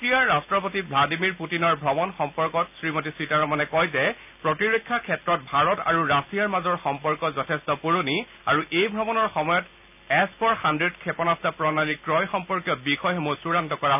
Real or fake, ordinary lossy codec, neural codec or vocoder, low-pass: real; none; none; 3.6 kHz